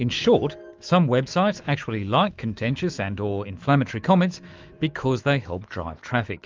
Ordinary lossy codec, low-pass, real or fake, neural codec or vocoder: Opus, 32 kbps; 7.2 kHz; fake; autoencoder, 48 kHz, 128 numbers a frame, DAC-VAE, trained on Japanese speech